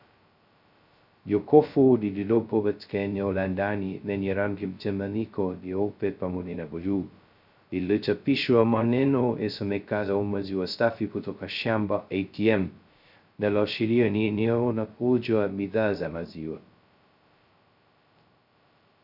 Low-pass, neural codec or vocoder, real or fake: 5.4 kHz; codec, 16 kHz, 0.2 kbps, FocalCodec; fake